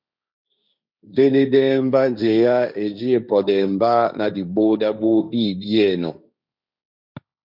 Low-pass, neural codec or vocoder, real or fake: 5.4 kHz; codec, 16 kHz, 1.1 kbps, Voila-Tokenizer; fake